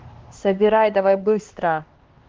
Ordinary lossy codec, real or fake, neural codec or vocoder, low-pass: Opus, 16 kbps; fake; codec, 16 kHz, 2 kbps, X-Codec, HuBERT features, trained on LibriSpeech; 7.2 kHz